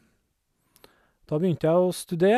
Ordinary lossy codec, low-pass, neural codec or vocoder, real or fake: none; 14.4 kHz; none; real